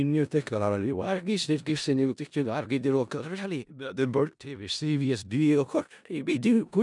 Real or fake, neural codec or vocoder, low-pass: fake; codec, 16 kHz in and 24 kHz out, 0.4 kbps, LongCat-Audio-Codec, four codebook decoder; 10.8 kHz